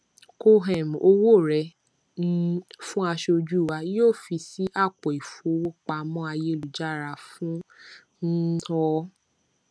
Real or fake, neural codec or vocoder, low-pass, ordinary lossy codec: real; none; none; none